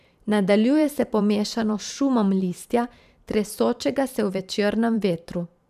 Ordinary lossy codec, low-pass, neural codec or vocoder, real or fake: none; 14.4 kHz; vocoder, 44.1 kHz, 128 mel bands every 512 samples, BigVGAN v2; fake